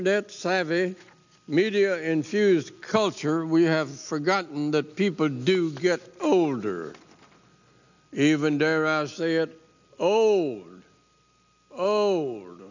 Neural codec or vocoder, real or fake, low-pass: none; real; 7.2 kHz